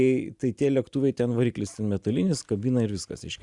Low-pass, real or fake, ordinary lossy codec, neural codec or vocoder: 10.8 kHz; real; Opus, 64 kbps; none